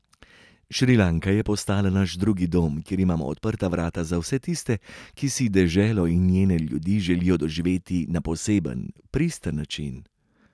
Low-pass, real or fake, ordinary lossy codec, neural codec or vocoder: none; real; none; none